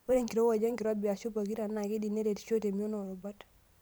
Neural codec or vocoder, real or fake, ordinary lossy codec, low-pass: vocoder, 44.1 kHz, 128 mel bands every 512 samples, BigVGAN v2; fake; none; none